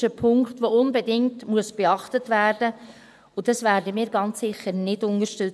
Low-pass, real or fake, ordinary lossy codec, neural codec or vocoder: none; fake; none; vocoder, 24 kHz, 100 mel bands, Vocos